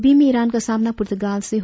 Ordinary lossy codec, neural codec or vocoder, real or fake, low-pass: none; none; real; none